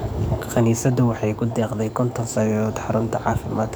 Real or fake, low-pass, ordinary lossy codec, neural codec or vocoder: fake; none; none; codec, 44.1 kHz, 7.8 kbps, DAC